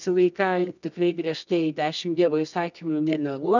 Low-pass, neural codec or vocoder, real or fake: 7.2 kHz; codec, 24 kHz, 0.9 kbps, WavTokenizer, medium music audio release; fake